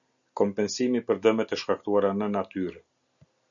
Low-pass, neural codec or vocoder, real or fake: 7.2 kHz; none; real